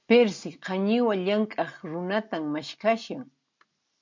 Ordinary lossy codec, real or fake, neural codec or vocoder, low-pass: MP3, 64 kbps; real; none; 7.2 kHz